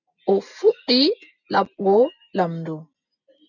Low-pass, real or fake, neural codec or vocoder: 7.2 kHz; real; none